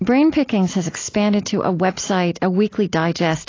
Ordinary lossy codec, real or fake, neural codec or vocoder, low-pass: AAC, 32 kbps; real; none; 7.2 kHz